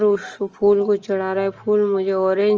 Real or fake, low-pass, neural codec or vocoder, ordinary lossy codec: real; 7.2 kHz; none; Opus, 24 kbps